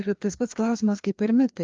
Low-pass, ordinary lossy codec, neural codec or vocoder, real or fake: 7.2 kHz; Opus, 32 kbps; codec, 16 kHz, 2 kbps, FreqCodec, larger model; fake